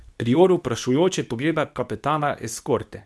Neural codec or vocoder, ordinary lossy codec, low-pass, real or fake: codec, 24 kHz, 0.9 kbps, WavTokenizer, medium speech release version 2; none; none; fake